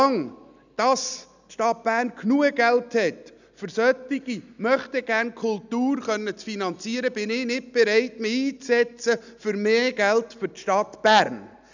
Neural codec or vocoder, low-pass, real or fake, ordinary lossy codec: none; 7.2 kHz; real; none